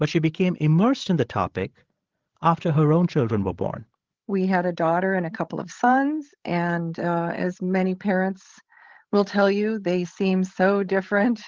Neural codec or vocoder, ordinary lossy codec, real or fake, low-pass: codec, 16 kHz, 16 kbps, FreqCodec, larger model; Opus, 16 kbps; fake; 7.2 kHz